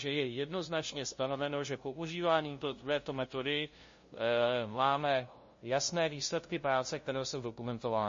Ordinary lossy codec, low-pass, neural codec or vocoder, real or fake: MP3, 32 kbps; 7.2 kHz; codec, 16 kHz, 0.5 kbps, FunCodec, trained on LibriTTS, 25 frames a second; fake